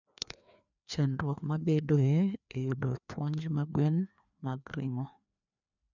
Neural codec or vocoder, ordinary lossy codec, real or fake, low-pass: codec, 16 kHz, 2 kbps, FreqCodec, larger model; none; fake; 7.2 kHz